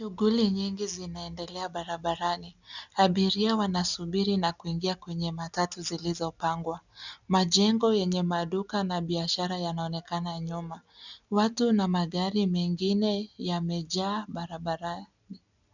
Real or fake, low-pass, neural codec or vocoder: real; 7.2 kHz; none